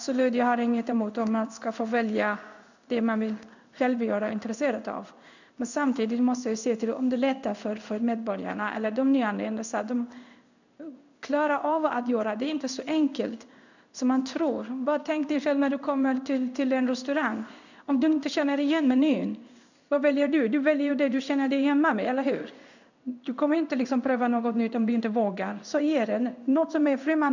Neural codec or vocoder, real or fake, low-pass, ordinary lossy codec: codec, 16 kHz in and 24 kHz out, 1 kbps, XY-Tokenizer; fake; 7.2 kHz; none